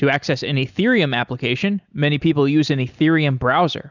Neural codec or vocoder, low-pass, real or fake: none; 7.2 kHz; real